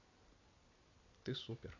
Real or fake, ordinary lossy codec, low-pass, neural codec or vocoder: real; MP3, 64 kbps; 7.2 kHz; none